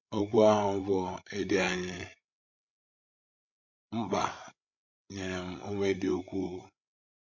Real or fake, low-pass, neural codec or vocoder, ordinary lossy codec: fake; 7.2 kHz; codec, 16 kHz, 16 kbps, FreqCodec, larger model; MP3, 48 kbps